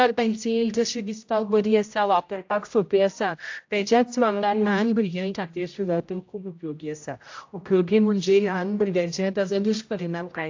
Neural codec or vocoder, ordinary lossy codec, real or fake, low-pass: codec, 16 kHz, 0.5 kbps, X-Codec, HuBERT features, trained on general audio; AAC, 48 kbps; fake; 7.2 kHz